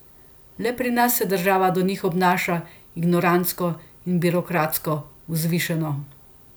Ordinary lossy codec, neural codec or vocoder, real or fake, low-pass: none; none; real; none